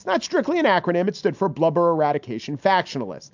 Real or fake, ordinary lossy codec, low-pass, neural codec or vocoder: real; MP3, 64 kbps; 7.2 kHz; none